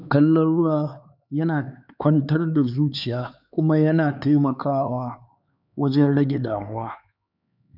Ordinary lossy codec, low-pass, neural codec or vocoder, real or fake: none; 5.4 kHz; codec, 16 kHz, 4 kbps, X-Codec, HuBERT features, trained on LibriSpeech; fake